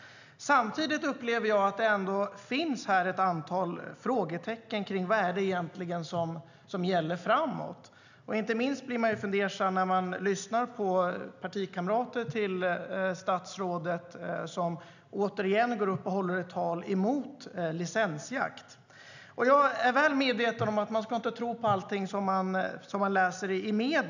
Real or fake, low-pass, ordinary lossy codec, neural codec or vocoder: real; 7.2 kHz; none; none